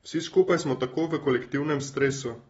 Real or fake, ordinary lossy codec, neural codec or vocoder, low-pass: real; AAC, 24 kbps; none; 19.8 kHz